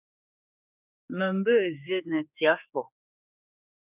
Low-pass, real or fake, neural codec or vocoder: 3.6 kHz; fake; codec, 16 kHz, 4 kbps, X-Codec, HuBERT features, trained on general audio